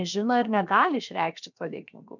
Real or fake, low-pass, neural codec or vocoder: fake; 7.2 kHz; codec, 16 kHz, about 1 kbps, DyCAST, with the encoder's durations